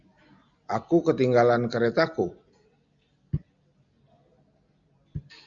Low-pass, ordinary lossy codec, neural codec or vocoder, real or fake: 7.2 kHz; Opus, 64 kbps; none; real